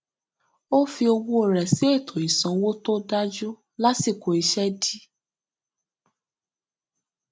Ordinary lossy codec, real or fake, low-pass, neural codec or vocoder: none; real; none; none